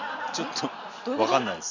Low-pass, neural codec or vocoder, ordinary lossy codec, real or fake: 7.2 kHz; none; none; real